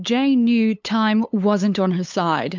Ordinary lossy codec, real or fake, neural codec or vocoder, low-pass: MP3, 64 kbps; fake; codec, 16 kHz, 8 kbps, FunCodec, trained on LibriTTS, 25 frames a second; 7.2 kHz